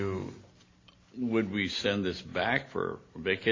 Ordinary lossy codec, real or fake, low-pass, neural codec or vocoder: AAC, 32 kbps; real; 7.2 kHz; none